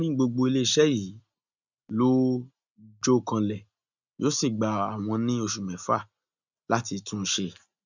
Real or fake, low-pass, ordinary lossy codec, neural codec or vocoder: real; 7.2 kHz; none; none